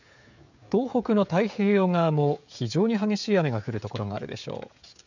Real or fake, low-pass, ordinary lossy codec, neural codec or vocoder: fake; 7.2 kHz; none; codec, 16 kHz, 16 kbps, FreqCodec, smaller model